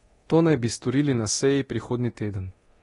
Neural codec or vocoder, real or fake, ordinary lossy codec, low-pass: codec, 24 kHz, 0.9 kbps, DualCodec; fake; AAC, 32 kbps; 10.8 kHz